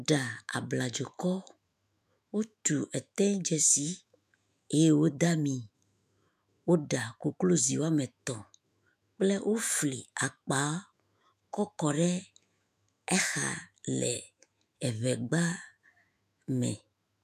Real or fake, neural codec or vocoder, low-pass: fake; autoencoder, 48 kHz, 128 numbers a frame, DAC-VAE, trained on Japanese speech; 14.4 kHz